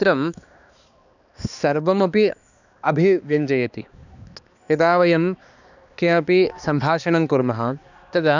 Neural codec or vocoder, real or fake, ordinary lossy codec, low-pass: codec, 16 kHz, 2 kbps, X-Codec, HuBERT features, trained on balanced general audio; fake; none; 7.2 kHz